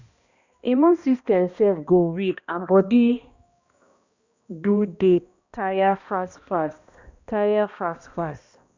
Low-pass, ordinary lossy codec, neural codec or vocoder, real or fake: 7.2 kHz; Opus, 64 kbps; codec, 16 kHz, 1 kbps, X-Codec, HuBERT features, trained on balanced general audio; fake